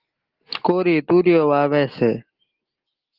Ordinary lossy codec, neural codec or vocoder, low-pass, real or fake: Opus, 16 kbps; none; 5.4 kHz; real